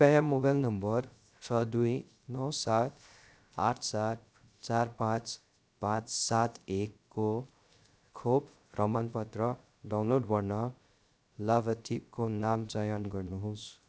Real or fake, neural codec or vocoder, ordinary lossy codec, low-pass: fake; codec, 16 kHz, 0.3 kbps, FocalCodec; none; none